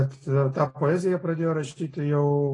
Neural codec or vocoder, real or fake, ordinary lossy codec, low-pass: none; real; AAC, 32 kbps; 10.8 kHz